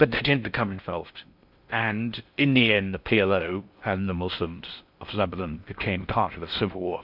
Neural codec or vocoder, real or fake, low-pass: codec, 16 kHz in and 24 kHz out, 0.6 kbps, FocalCodec, streaming, 4096 codes; fake; 5.4 kHz